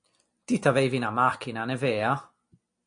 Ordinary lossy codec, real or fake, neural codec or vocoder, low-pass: MP3, 48 kbps; real; none; 9.9 kHz